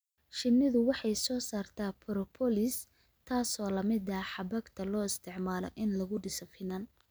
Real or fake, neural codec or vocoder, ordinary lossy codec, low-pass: real; none; none; none